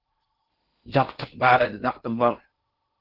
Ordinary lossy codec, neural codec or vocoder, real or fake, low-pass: Opus, 16 kbps; codec, 16 kHz in and 24 kHz out, 0.6 kbps, FocalCodec, streaming, 2048 codes; fake; 5.4 kHz